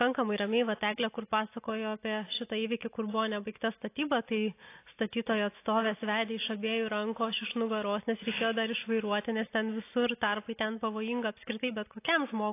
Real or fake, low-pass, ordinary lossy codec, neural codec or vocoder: real; 3.6 kHz; AAC, 24 kbps; none